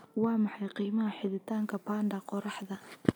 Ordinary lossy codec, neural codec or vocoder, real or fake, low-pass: none; none; real; none